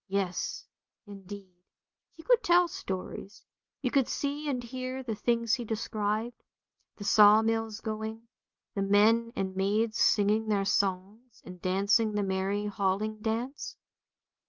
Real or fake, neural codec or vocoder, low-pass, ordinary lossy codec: real; none; 7.2 kHz; Opus, 24 kbps